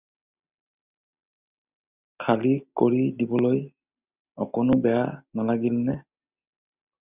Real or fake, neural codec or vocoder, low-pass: real; none; 3.6 kHz